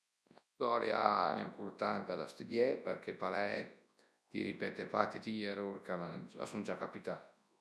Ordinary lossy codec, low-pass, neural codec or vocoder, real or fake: none; none; codec, 24 kHz, 0.9 kbps, WavTokenizer, large speech release; fake